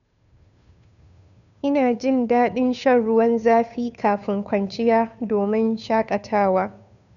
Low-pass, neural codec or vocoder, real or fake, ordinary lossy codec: 7.2 kHz; codec, 16 kHz, 2 kbps, FunCodec, trained on Chinese and English, 25 frames a second; fake; none